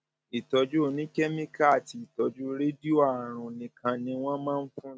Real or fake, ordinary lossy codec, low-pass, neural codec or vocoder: real; none; none; none